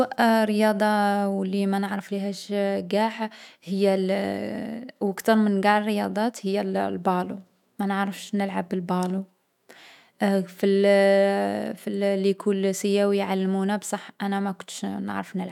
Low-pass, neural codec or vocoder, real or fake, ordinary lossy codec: 19.8 kHz; autoencoder, 48 kHz, 128 numbers a frame, DAC-VAE, trained on Japanese speech; fake; none